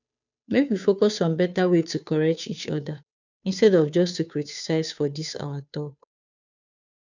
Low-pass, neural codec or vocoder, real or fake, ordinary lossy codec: 7.2 kHz; codec, 16 kHz, 2 kbps, FunCodec, trained on Chinese and English, 25 frames a second; fake; none